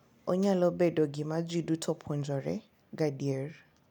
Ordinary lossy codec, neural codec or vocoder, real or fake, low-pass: none; none; real; 19.8 kHz